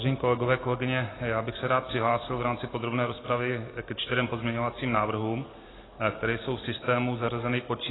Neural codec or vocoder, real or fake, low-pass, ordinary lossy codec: none; real; 7.2 kHz; AAC, 16 kbps